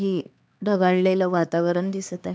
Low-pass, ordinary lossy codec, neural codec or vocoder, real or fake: none; none; codec, 16 kHz, 2 kbps, X-Codec, HuBERT features, trained on LibriSpeech; fake